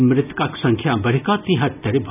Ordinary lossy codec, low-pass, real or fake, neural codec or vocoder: none; 3.6 kHz; real; none